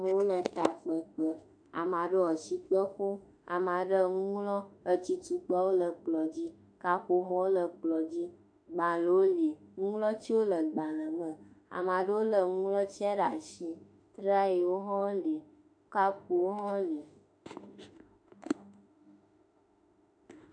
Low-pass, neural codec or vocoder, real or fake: 9.9 kHz; autoencoder, 48 kHz, 32 numbers a frame, DAC-VAE, trained on Japanese speech; fake